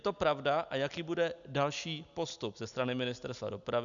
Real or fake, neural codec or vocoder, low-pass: real; none; 7.2 kHz